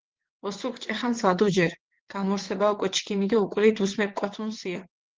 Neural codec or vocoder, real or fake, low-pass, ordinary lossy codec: vocoder, 22.05 kHz, 80 mel bands, WaveNeXt; fake; 7.2 kHz; Opus, 16 kbps